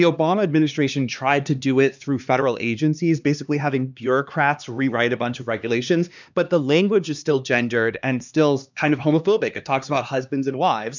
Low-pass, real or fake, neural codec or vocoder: 7.2 kHz; fake; codec, 16 kHz, 2 kbps, X-Codec, HuBERT features, trained on LibriSpeech